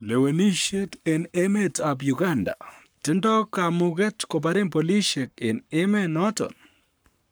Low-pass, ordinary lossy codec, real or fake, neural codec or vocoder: none; none; fake; codec, 44.1 kHz, 7.8 kbps, Pupu-Codec